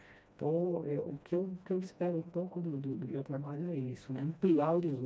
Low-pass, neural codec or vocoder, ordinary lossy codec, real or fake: none; codec, 16 kHz, 1 kbps, FreqCodec, smaller model; none; fake